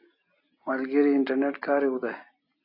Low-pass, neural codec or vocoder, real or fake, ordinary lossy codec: 5.4 kHz; none; real; AAC, 32 kbps